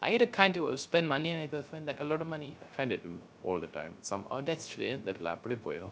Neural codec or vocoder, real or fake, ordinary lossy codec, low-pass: codec, 16 kHz, 0.3 kbps, FocalCodec; fake; none; none